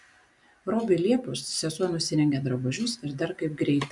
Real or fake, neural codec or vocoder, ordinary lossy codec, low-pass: real; none; MP3, 96 kbps; 10.8 kHz